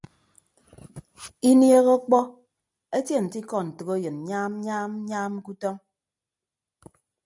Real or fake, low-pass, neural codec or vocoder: real; 10.8 kHz; none